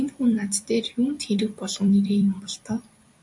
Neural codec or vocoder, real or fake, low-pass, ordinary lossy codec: vocoder, 44.1 kHz, 128 mel bands every 256 samples, BigVGAN v2; fake; 10.8 kHz; MP3, 64 kbps